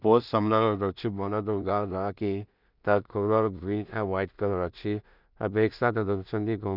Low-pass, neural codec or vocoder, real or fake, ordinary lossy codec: 5.4 kHz; codec, 16 kHz in and 24 kHz out, 0.4 kbps, LongCat-Audio-Codec, two codebook decoder; fake; none